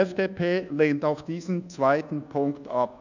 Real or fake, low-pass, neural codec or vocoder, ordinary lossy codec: fake; 7.2 kHz; codec, 24 kHz, 1.2 kbps, DualCodec; none